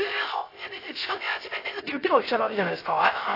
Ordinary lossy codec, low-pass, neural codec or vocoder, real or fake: AAC, 32 kbps; 5.4 kHz; codec, 16 kHz, 0.3 kbps, FocalCodec; fake